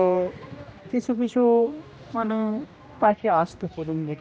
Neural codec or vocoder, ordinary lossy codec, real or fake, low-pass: codec, 16 kHz, 1 kbps, X-Codec, HuBERT features, trained on general audio; none; fake; none